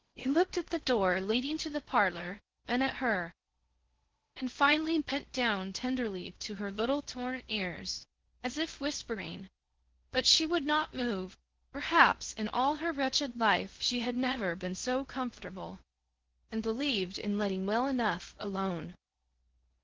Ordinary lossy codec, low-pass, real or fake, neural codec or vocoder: Opus, 16 kbps; 7.2 kHz; fake; codec, 16 kHz in and 24 kHz out, 0.6 kbps, FocalCodec, streaming, 4096 codes